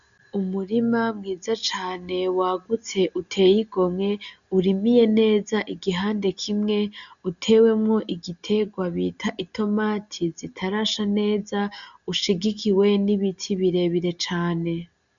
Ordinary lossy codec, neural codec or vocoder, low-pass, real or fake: MP3, 96 kbps; none; 7.2 kHz; real